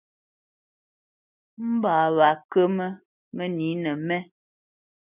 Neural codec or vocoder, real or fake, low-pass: none; real; 3.6 kHz